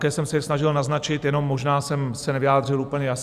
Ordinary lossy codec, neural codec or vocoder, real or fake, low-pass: Opus, 64 kbps; vocoder, 44.1 kHz, 128 mel bands every 256 samples, BigVGAN v2; fake; 14.4 kHz